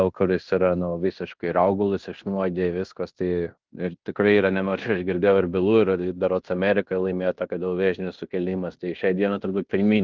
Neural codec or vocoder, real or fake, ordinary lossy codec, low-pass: codec, 16 kHz in and 24 kHz out, 0.9 kbps, LongCat-Audio-Codec, fine tuned four codebook decoder; fake; Opus, 32 kbps; 7.2 kHz